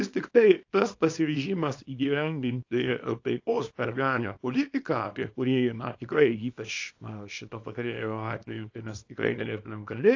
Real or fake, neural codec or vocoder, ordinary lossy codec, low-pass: fake; codec, 24 kHz, 0.9 kbps, WavTokenizer, small release; AAC, 48 kbps; 7.2 kHz